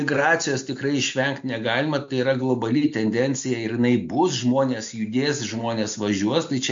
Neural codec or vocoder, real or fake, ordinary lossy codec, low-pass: none; real; MP3, 48 kbps; 7.2 kHz